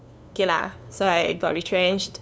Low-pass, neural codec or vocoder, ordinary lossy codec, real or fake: none; codec, 16 kHz, 2 kbps, FunCodec, trained on LibriTTS, 25 frames a second; none; fake